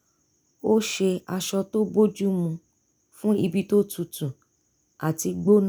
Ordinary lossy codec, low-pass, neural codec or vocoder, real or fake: none; none; none; real